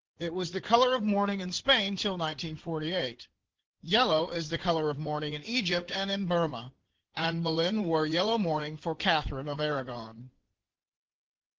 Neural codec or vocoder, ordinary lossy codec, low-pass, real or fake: codec, 16 kHz in and 24 kHz out, 2.2 kbps, FireRedTTS-2 codec; Opus, 16 kbps; 7.2 kHz; fake